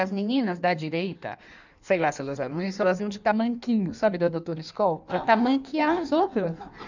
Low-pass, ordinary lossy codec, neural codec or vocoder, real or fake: 7.2 kHz; none; codec, 16 kHz in and 24 kHz out, 1.1 kbps, FireRedTTS-2 codec; fake